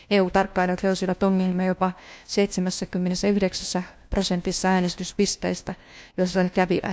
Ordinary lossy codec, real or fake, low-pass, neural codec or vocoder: none; fake; none; codec, 16 kHz, 1 kbps, FunCodec, trained on LibriTTS, 50 frames a second